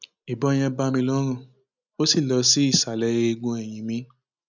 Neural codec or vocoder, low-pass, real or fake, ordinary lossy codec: none; 7.2 kHz; real; none